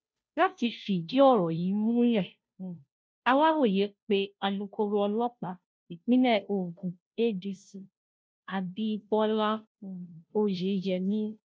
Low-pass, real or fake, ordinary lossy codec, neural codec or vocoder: none; fake; none; codec, 16 kHz, 0.5 kbps, FunCodec, trained on Chinese and English, 25 frames a second